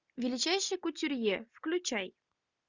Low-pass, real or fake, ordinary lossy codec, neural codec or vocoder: 7.2 kHz; real; Opus, 64 kbps; none